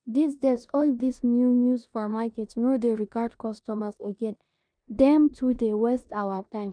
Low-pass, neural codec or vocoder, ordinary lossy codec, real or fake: 9.9 kHz; codec, 16 kHz in and 24 kHz out, 0.9 kbps, LongCat-Audio-Codec, four codebook decoder; MP3, 96 kbps; fake